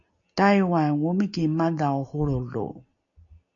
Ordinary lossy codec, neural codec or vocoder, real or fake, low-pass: MP3, 96 kbps; none; real; 7.2 kHz